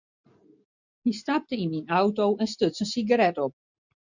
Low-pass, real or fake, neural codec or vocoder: 7.2 kHz; real; none